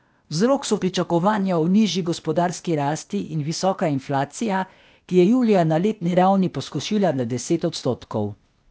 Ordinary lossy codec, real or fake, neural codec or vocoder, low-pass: none; fake; codec, 16 kHz, 0.8 kbps, ZipCodec; none